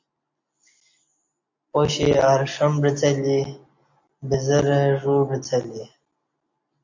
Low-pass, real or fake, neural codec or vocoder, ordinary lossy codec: 7.2 kHz; real; none; MP3, 64 kbps